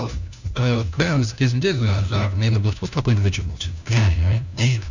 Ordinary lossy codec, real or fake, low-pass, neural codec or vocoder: none; fake; 7.2 kHz; codec, 16 kHz, 1 kbps, FunCodec, trained on LibriTTS, 50 frames a second